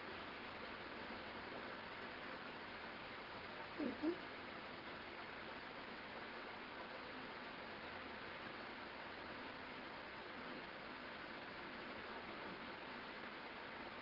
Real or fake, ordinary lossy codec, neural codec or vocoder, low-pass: fake; Opus, 16 kbps; vocoder, 22.05 kHz, 80 mel bands, WaveNeXt; 5.4 kHz